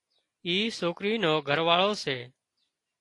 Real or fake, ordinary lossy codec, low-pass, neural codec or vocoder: real; AAC, 48 kbps; 10.8 kHz; none